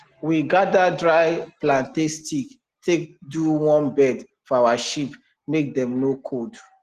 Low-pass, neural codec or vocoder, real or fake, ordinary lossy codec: 14.4 kHz; none; real; Opus, 16 kbps